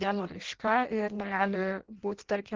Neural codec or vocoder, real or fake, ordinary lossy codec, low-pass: codec, 16 kHz in and 24 kHz out, 0.6 kbps, FireRedTTS-2 codec; fake; Opus, 16 kbps; 7.2 kHz